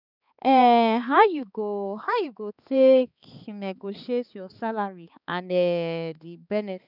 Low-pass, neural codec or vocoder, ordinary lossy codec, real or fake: 5.4 kHz; codec, 16 kHz, 4 kbps, X-Codec, HuBERT features, trained on balanced general audio; none; fake